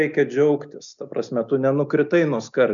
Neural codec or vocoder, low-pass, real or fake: none; 7.2 kHz; real